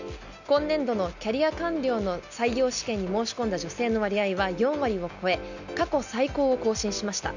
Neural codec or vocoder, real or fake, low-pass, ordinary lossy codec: none; real; 7.2 kHz; none